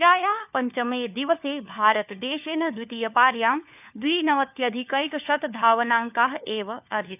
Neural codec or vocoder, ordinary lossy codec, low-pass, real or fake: codec, 16 kHz, 4 kbps, FunCodec, trained on LibriTTS, 50 frames a second; none; 3.6 kHz; fake